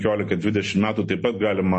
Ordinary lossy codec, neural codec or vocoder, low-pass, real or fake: MP3, 32 kbps; none; 9.9 kHz; real